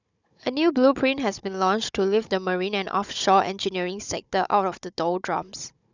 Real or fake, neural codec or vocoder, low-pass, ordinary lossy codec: fake; codec, 16 kHz, 16 kbps, FunCodec, trained on Chinese and English, 50 frames a second; 7.2 kHz; none